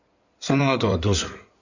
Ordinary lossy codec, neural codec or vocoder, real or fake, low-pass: none; codec, 16 kHz in and 24 kHz out, 2.2 kbps, FireRedTTS-2 codec; fake; 7.2 kHz